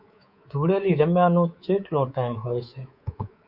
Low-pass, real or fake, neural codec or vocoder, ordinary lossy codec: 5.4 kHz; fake; codec, 24 kHz, 3.1 kbps, DualCodec; Opus, 64 kbps